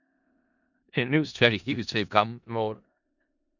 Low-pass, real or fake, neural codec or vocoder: 7.2 kHz; fake; codec, 16 kHz in and 24 kHz out, 0.4 kbps, LongCat-Audio-Codec, four codebook decoder